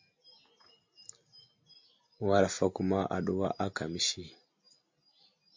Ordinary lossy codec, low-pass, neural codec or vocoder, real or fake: MP3, 48 kbps; 7.2 kHz; none; real